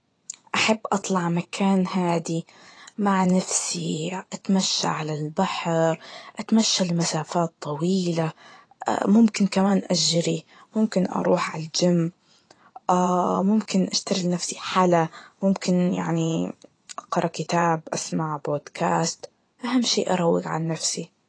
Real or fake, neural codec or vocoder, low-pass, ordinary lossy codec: fake; vocoder, 24 kHz, 100 mel bands, Vocos; 9.9 kHz; AAC, 32 kbps